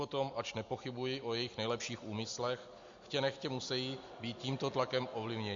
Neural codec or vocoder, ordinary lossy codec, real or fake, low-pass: none; MP3, 48 kbps; real; 7.2 kHz